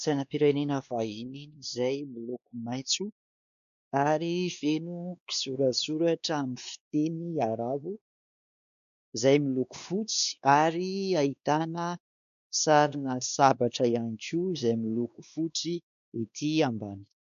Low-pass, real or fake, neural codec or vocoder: 7.2 kHz; fake; codec, 16 kHz, 2 kbps, X-Codec, WavLM features, trained on Multilingual LibriSpeech